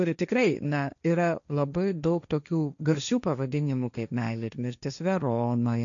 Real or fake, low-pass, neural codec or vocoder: fake; 7.2 kHz; codec, 16 kHz, 1.1 kbps, Voila-Tokenizer